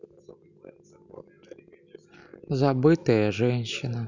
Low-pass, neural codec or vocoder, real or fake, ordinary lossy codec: 7.2 kHz; none; real; none